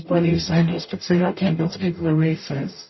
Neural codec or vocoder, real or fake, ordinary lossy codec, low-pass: codec, 44.1 kHz, 0.9 kbps, DAC; fake; MP3, 24 kbps; 7.2 kHz